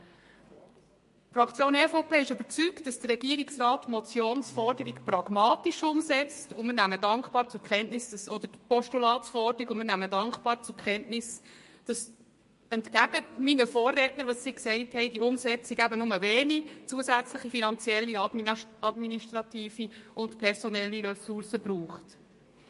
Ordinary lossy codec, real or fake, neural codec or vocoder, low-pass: MP3, 48 kbps; fake; codec, 32 kHz, 1.9 kbps, SNAC; 14.4 kHz